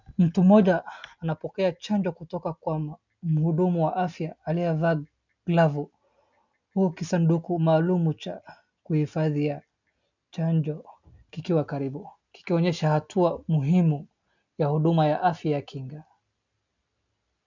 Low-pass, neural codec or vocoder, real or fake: 7.2 kHz; none; real